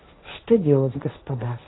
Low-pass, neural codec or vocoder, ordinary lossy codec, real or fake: 7.2 kHz; codec, 16 kHz, 1.1 kbps, Voila-Tokenizer; AAC, 16 kbps; fake